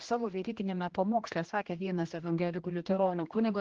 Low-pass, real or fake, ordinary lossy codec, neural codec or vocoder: 7.2 kHz; fake; Opus, 16 kbps; codec, 16 kHz, 1 kbps, X-Codec, HuBERT features, trained on general audio